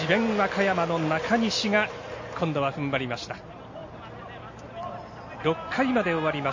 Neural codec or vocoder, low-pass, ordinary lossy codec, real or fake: none; 7.2 kHz; MP3, 32 kbps; real